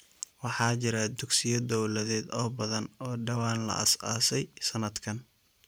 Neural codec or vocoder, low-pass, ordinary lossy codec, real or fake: vocoder, 44.1 kHz, 128 mel bands every 512 samples, BigVGAN v2; none; none; fake